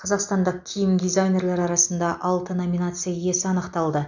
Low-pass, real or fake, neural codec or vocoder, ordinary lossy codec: 7.2 kHz; real; none; none